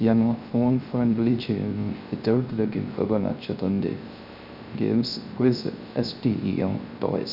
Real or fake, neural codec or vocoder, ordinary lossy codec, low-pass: fake; codec, 16 kHz, 0.3 kbps, FocalCodec; none; 5.4 kHz